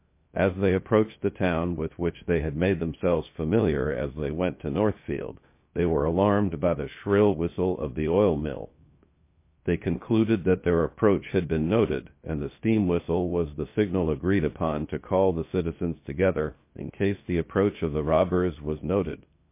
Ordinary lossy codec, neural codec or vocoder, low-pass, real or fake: MP3, 24 kbps; codec, 16 kHz, 0.7 kbps, FocalCodec; 3.6 kHz; fake